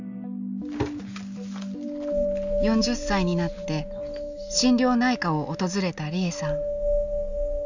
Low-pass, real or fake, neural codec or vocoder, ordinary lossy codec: 7.2 kHz; real; none; none